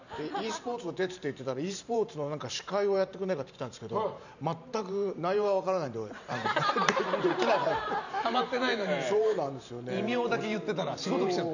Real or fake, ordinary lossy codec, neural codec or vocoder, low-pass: fake; none; vocoder, 44.1 kHz, 128 mel bands every 512 samples, BigVGAN v2; 7.2 kHz